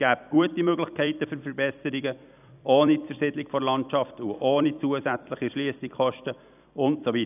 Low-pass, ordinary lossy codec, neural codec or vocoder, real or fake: 3.6 kHz; none; vocoder, 44.1 kHz, 128 mel bands every 256 samples, BigVGAN v2; fake